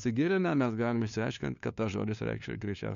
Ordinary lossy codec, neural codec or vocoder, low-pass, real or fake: MP3, 64 kbps; codec, 16 kHz, 2 kbps, FunCodec, trained on LibriTTS, 25 frames a second; 7.2 kHz; fake